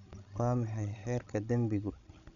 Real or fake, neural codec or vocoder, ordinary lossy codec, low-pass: fake; codec, 16 kHz, 16 kbps, FreqCodec, larger model; none; 7.2 kHz